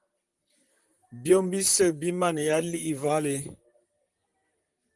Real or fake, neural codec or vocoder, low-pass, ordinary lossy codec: fake; vocoder, 44.1 kHz, 128 mel bands, Pupu-Vocoder; 10.8 kHz; Opus, 24 kbps